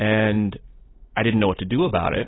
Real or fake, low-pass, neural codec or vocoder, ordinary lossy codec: real; 7.2 kHz; none; AAC, 16 kbps